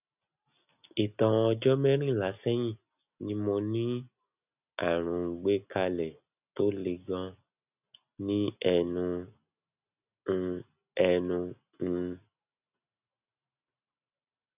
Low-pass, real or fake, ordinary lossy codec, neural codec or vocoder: 3.6 kHz; real; none; none